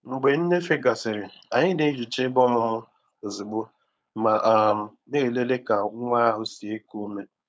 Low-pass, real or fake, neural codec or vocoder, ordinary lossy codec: none; fake; codec, 16 kHz, 4.8 kbps, FACodec; none